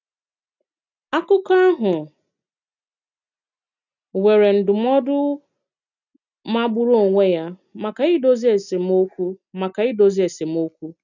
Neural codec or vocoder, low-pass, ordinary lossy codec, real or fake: none; 7.2 kHz; none; real